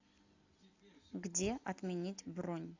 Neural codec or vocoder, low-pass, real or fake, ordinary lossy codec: none; 7.2 kHz; real; AAC, 48 kbps